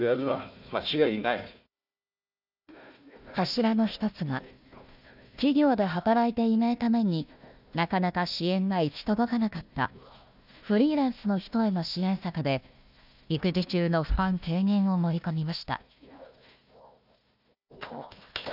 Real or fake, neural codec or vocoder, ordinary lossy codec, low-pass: fake; codec, 16 kHz, 1 kbps, FunCodec, trained on Chinese and English, 50 frames a second; none; 5.4 kHz